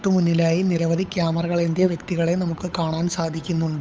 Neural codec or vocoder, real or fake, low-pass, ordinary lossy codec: codec, 16 kHz, 8 kbps, FunCodec, trained on Chinese and English, 25 frames a second; fake; none; none